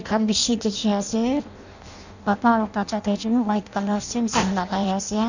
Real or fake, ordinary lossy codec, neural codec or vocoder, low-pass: fake; none; codec, 16 kHz in and 24 kHz out, 0.6 kbps, FireRedTTS-2 codec; 7.2 kHz